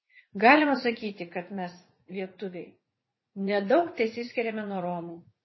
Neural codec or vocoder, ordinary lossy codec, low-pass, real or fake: vocoder, 44.1 kHz, 80 mel bands, Vocos; MP3, 24 kbps; 7.2 kHz; fake